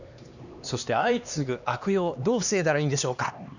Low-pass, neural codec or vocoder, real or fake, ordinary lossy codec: 7.2 kHz; codec, 16 kHz, 2 kbps, X-Codec, HuBERT features, trained on LibriSpeech; fake; none